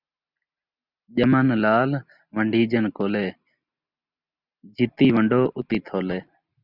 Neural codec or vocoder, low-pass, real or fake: none; 5.4 kHz; real